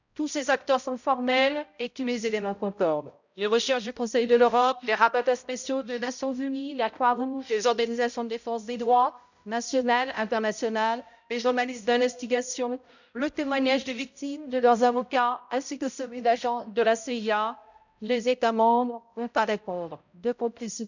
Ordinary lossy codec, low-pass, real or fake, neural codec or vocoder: none; 7.2 kHz; fake; codec, 16 kHz, 0.5 kbps, X-Codec, HuBERT features, trained on balanced general audio